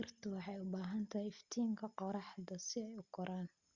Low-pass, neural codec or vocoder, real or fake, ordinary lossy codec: 7.2 kHz; none; real; Opus, 64 kbps